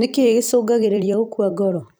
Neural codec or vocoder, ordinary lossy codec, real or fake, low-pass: vocoder, 44.1 kHz, 128 mel bands every 256 samples, BigVGAN v2; none; fake; none